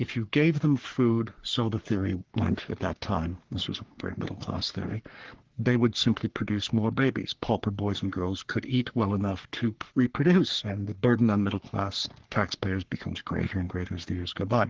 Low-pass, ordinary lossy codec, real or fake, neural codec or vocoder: 7.2 kHz; Opus, 16 kbps; fake; codec, 44.1 kHz, 3.4 kbps, Pupu-Codec